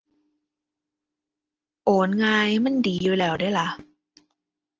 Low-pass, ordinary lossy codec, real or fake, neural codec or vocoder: 7.2 kHz; Opus, 16 kbps; real; none